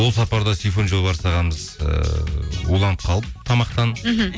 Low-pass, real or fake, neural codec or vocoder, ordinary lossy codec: none; real; none; none